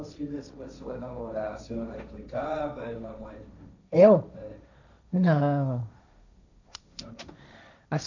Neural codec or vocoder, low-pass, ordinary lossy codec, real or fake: codec, 16 kHz, 1.1 kbps, Voila-Tokenizer; 7.2 kHz; none; fake